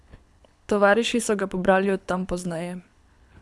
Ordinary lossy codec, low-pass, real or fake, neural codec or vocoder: none; none; fake; codec, 24 kHz, 6 kbps, HILCodec